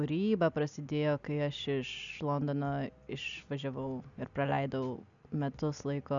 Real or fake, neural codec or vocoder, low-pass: real; none; 7.2 kHz